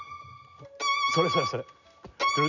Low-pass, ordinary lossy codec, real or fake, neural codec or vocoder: 7.2 kHz; none; real; none